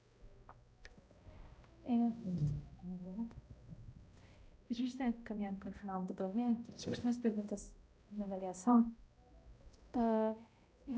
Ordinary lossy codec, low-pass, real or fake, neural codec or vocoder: none; none; fake; codec, 16 kHz, 0.5 kbps, X-Codec, HuBERT features, trained on balanced general audio